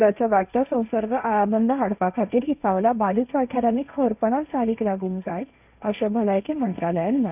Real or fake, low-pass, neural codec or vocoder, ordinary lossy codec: fake; 3.6 kHz; codec, 16 kHz, 1.1 kbps, Voila-Tokenizer; none